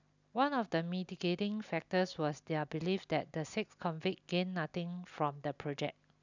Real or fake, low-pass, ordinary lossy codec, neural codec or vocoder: real; 7.2 kHz; none; none